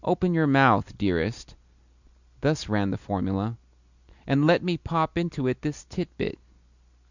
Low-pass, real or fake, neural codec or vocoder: 7.2 kHz; real; none